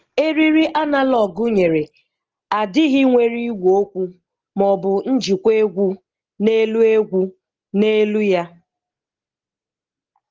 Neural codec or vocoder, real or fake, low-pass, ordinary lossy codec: none; real; 7.2 kHz; Opus, 16 kbps